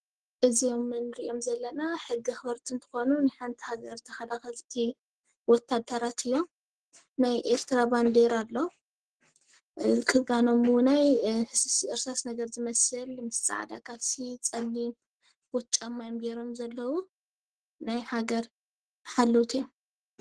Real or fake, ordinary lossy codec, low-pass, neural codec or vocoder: fake; Opus, 16 kbps; 10.8 kHz; codec, 44.1 kHz, 7.8 kbps, Pupu-Codec